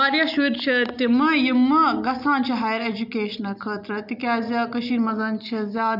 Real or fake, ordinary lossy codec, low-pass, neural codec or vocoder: real; none; 5.4 kHz; none